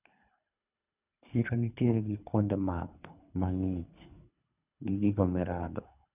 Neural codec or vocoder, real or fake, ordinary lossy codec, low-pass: codec, 24 kHz, 3 kbps, HILCodec; fake; none; 3.6 kHz